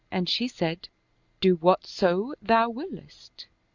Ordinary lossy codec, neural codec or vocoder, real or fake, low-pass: Opus, 64 kbps; none; real; 7.2 kHz